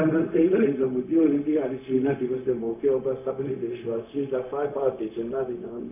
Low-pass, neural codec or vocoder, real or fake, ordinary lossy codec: 3.6 kHz; codec, 16 kHz, 0.4 kbps, LongCat-Audio-Codec; fake; none